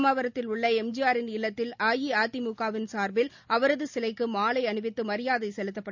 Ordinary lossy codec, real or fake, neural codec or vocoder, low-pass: none; real; none; 7.2 kHz